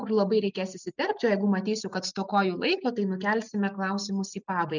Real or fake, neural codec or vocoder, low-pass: real; none; 7.2 kHz